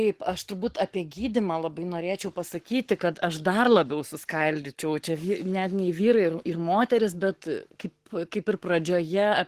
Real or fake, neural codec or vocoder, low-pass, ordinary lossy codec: fake; codec, 44.1 kHz, 7.8 kbps, Pupu-Codec; 14.4 kHz; Opus, 16 kbps